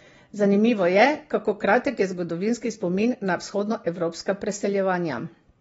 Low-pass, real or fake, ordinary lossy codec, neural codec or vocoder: 9.9 kHz; real; AAC, 24 kbps; none